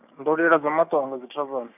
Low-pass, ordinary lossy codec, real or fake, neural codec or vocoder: 3.6 kHz; none; fake; codec, 16 kHz, 6 kbps, DAC